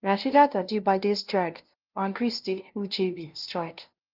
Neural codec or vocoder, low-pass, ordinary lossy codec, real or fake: codec, 16 kHz, 0.5 kbps, FunCodec, trained on LibriTTS, 25 frames a second; 5.4 kHz; Opus, 24 kbps; fake